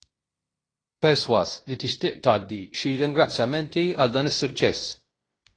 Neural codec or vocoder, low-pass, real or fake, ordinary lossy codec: codec, 16 kHz in and 24 kHz out, 0.9 kbps, LongCat-Audio-Codec, fine tuned four codebook decoder; 9.9 kHz; fake; AAC, 32 kbps